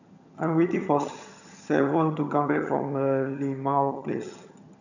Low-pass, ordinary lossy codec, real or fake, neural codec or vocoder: 7.2 kHz; none; fake; vocoder, 22.05 kHz, 80 mel bands, HiFi-GAN